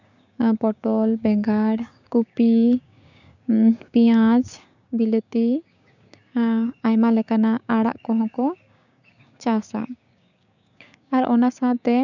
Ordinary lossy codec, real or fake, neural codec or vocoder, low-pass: none; fake; codec, 16 kHz, 6 kbps, DAC; 7.2 kHz